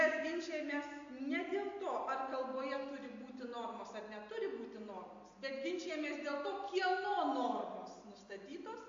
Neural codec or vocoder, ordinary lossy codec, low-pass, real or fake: none; AAC, 48 kbps; 7.2 kHz; real